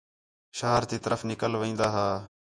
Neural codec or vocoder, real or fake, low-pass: vocoder, 48 kHz, 128 mel bands, Vocos; fake; 9.9 kHz